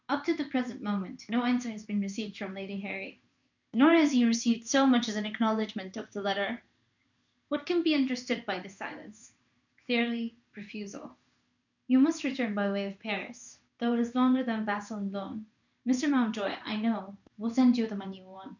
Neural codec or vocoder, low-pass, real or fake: codec, 16 kHz in and 24 kHz out, 1 kbps, XY-Tokenizer; 7.2 kHz; fake